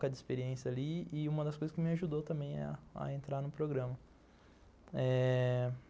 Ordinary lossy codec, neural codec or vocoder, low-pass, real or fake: none; none; none; real